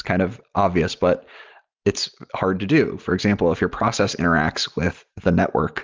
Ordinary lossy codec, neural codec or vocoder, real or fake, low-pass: Opus, 32 kbps; none; real; 7.2 kHz